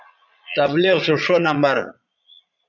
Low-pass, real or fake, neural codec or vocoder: 7.2 kHz; fake; vocoder, 22.05 kHz, 80 mel bands, Vocos